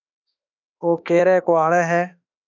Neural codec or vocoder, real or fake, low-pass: autoencoder, 48 kHz, 32 numbers a frame, DAC-VAE, trained on Japanese speech; fake; 7.2 kHz